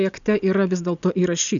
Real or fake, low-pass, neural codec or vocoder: real; 7.2 kHz; none